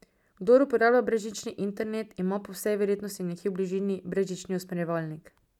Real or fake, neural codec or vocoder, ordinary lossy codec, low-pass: real; none; none; 19.8 kHz